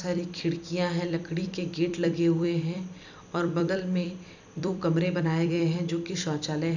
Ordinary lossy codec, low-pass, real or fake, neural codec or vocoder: none; 7.2 kHz; fake; vocoder, 44.1 kHz, 128 mel bands every 256 samples, BigVGAN v2